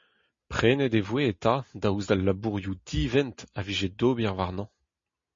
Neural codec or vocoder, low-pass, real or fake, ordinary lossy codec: none; 7.2 kHz; real; MP3, 32 kbps